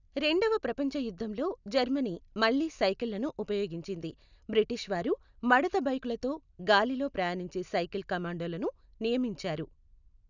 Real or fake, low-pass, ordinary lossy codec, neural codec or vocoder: real; 7.2 kHz; none; none